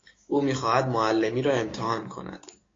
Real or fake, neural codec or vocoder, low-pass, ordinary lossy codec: fake; codec, 16 kHz, 6 kbps, DAC; 7.2 kHz; AAC, 32 kbps